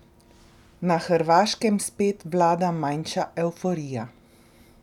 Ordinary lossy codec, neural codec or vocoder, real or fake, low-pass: none; none; real; 19.8 kHz